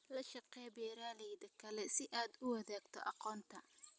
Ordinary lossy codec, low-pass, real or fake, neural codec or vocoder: none; none; real; none